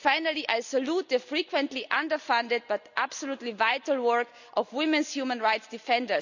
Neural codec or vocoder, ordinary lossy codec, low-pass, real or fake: none; none; 7.2 kHz; real